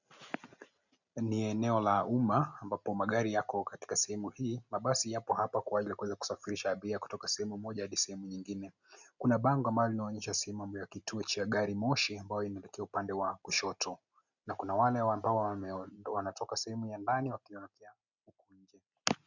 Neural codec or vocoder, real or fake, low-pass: none; real; 7.2 kHz